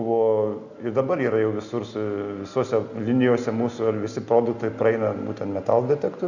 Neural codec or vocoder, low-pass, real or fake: none; 7.2 kHz; real